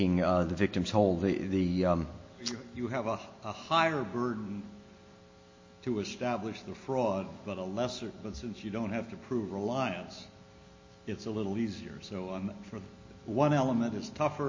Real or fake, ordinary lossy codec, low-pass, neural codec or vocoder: real; MP3, 32 kbps; 7.2 kHz; none